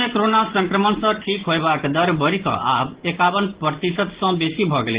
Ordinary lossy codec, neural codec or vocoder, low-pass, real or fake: Opus, 16 kbps; none; 3.6 kHz; real